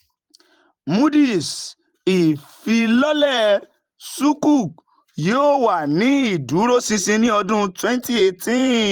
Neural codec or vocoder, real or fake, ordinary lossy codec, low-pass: vocoder, 44.1 kHz, 128 mel bands every 512 samples, BigVGAN v2; fake; Opus, 32 kbps; 19.8 kHz